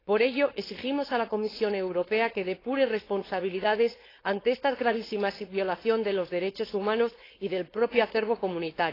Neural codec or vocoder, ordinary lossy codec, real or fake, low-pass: codec, 16 kHz, 4.8 kbps, FACodec; AAC, 24 kbps; fake; 5.4 kHz